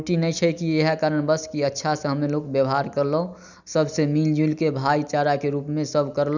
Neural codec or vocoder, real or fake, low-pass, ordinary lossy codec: none; real; 7.2 kHz; none